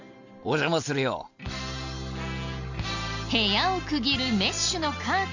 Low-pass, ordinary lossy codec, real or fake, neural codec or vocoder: 7.2 kHz; none; real; none